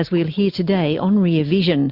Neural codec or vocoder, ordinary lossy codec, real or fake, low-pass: none; Opus, 64 kbps; real; 5.4 kHz